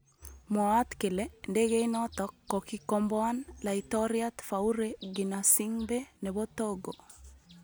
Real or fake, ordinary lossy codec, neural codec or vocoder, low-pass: real; none; none; none